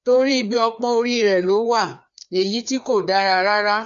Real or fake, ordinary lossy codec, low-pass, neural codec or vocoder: fake; MP3, 64 kbps; 7.2 kHz; codec, 16 kHz, 2 kbps, FreqCodec, larger model